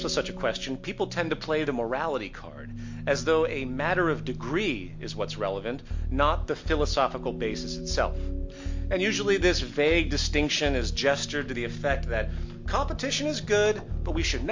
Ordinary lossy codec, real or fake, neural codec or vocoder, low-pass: MP3, 48 kbps; real; none; 7.2 kHz